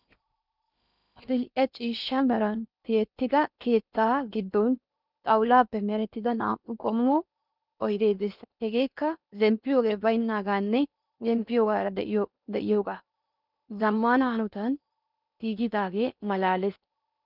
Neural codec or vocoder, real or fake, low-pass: codec, 16 kHz in and 24 kHz out, 0.8 kbps, FocalCodec, streaming, 65536 codes; fake; 5.4 kHz